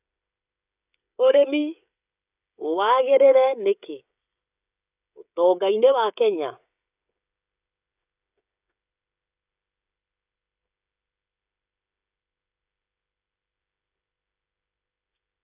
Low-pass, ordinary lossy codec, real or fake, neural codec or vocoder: 3.6 kHz; none; fake; codec, 16 kHz, 16 kbps, FreqCodec, smaller model